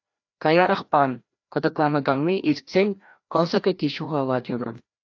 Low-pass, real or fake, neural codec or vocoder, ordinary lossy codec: 7.2 kHz; fake; codec, 16 kHz, 1 kbps, FreqCodec, larger model; AAC, 48 kbps